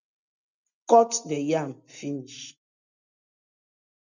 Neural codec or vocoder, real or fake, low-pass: none; real; 7.2 kHz